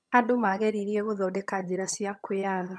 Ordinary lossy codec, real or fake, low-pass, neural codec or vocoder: none; fake; none; vocoder, 22.05 kHz, 80 mel bands, HiFi-GAN